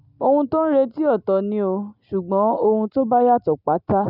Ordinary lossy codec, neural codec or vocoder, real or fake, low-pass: none; none; real; 5.4 kHz